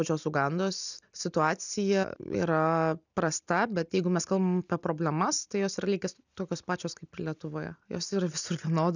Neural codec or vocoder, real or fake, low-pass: none; real; 7.2 kHz